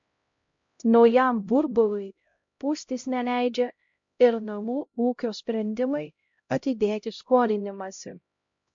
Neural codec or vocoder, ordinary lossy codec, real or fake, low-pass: codec, 16 kHz, 0.5 kbps, X-Codec, HuBERT features, trained on LibriSpeech; MP3, 48 kbps; fake; 7.2 kHz